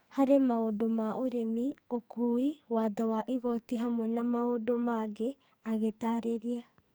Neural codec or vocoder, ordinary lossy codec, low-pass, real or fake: codec, 44.1 kHz, 2.6 kbps, SNAC; none; none; fake